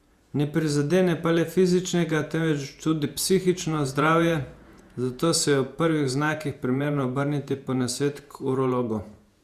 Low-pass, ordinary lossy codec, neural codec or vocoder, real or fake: 14.4 kHz; Opus, 64 kbps; vocoder, 48 kHz, 128 mel bands, Vocos; fake